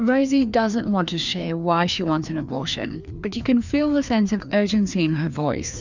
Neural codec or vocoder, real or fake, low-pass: codec, 16 kHz, 2 kbps, FreqCodec, larger model; fake; 7.2 kHz